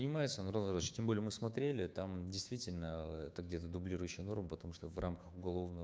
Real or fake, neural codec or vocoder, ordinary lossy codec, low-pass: fake; codec, 16 kHz, 6 kbps, DAC; none; none